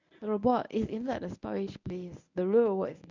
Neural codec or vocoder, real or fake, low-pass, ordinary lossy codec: codec, 24 kHz, 0.9 kbps, WavTokenizer, medium speech release version 1; fake; 7.2 kHz; none